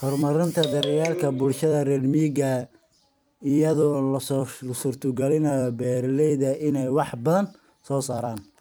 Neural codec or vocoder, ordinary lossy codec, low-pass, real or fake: vocoder, 44.1 kHz, 128 mel bands every 256 samples, BigVGAN v2; none; none; fake